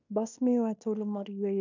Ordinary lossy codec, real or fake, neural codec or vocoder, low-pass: none; fake; codec, 16 kHz in and 24 kHz out, 0.9 kbps, LongCat-Audio-Codec, fine tuned four codebook decoder; 7.2 kHz